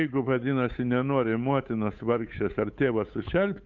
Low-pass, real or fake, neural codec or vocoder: 7.2 kHz; fake; codec, 16 kHz, 8 kbps, FunCodec, trained on Chinese and English, 25 frames a second